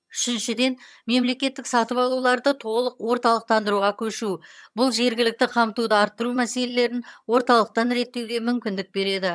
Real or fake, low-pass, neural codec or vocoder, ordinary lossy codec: fake; none; vocoder, 22.05 kHz, 80 mel bands, HiFi-GAN; none